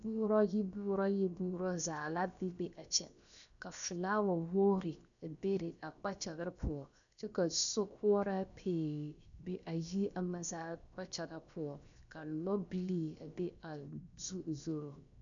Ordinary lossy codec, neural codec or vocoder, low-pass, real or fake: MP3, 96 kbps; codec, 16 kHz, 0.7 kbps, FocalCodec; 7.2 kHz; fake